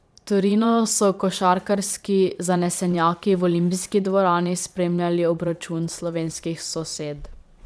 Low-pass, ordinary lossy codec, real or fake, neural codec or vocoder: none; none; fake; vocoder, 22.05 kHz, 80 mel bands, Vocos